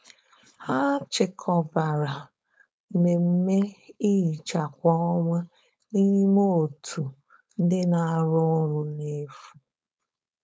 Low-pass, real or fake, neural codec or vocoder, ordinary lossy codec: none; fake; codec, 16 kHz, 4.8 kbps, FACodec; none